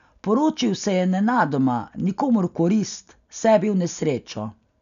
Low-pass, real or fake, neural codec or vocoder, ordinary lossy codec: 7.2 kHz; real; none; none